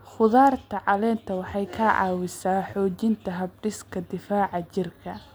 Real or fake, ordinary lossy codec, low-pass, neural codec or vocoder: real; none; none; none